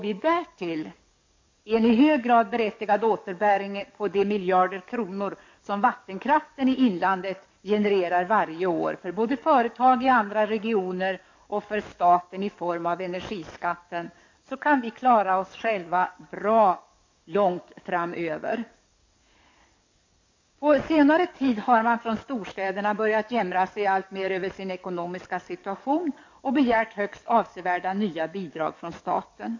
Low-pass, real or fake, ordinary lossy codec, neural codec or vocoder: 7.2 kHz; fake; MP3, 48 kbps; codec, 44.1 kHz, 7.8 kbps, DAC